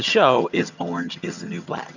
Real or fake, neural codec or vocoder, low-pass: fake; vocoder, 22.05 kHz, 80 mel bands, HiFi-GAN; 7.2 kHz